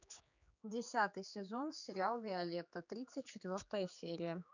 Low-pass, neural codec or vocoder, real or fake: 7.2 kHz; codec, 16 kHz, 4 kbps, X-Codec, HuBERT features, trained on general audio; fake